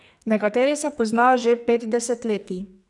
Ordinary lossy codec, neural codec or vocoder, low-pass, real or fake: none; codec, 44.1 kHz, 2.6 kbps, SNAC; 10.8 kHz; fake